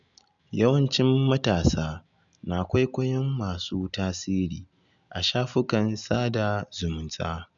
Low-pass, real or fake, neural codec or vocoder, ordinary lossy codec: 7.2 kHz; real; none; none